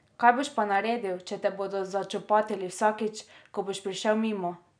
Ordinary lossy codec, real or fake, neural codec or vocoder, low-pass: none; real; none; 9.9 kHz